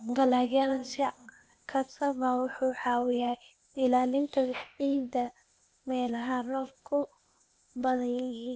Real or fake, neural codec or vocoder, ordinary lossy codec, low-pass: fake; codec, 16 kHz, 0.8 kbps, ZipCodec; none; none